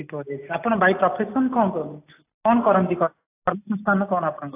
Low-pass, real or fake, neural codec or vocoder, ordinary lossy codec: 3.6 kHz; real; none; AAC, 24 kbps